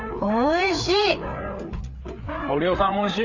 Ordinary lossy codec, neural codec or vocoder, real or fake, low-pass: none; codec, 16 kHz, 4 kbps, FreqCodec, larger model; fake; 7.2 kHz